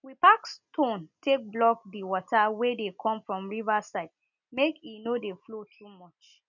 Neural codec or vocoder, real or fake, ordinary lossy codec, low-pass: none; real; none; 7.2 kHz